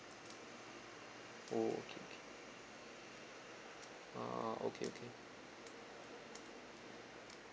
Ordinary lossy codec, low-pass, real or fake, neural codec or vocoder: none; none; real; none